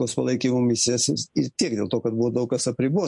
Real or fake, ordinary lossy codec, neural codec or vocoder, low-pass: real; MP3, 48 kbps; none; 10.8 kHz